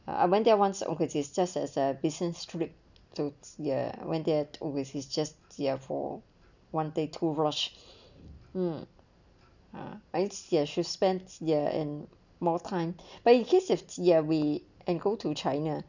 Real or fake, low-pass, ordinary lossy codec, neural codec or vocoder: real; 7.2 kHz; none; none